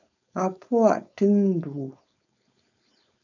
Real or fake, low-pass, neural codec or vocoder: fake; 7.2 kHz; codec, 16 kHz, 4.8 kbps, FACodec